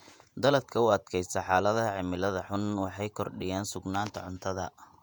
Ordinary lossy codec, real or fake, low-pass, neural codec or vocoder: none; fake; 19.8 kHz; vocoder, 48 kHz, 128 mel bands, Vocos